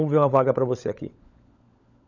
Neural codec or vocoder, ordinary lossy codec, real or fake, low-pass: codec, 16 kHz, 16 kbps, FunCodec, trained on LibriTTS, 50 frames a second; none; fake; 7.2 kHz